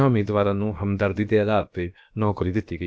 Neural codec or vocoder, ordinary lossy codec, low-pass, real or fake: codec, 16 kHz, about 1 kbps, DyCAST, with the encoder's durations; none; none; fake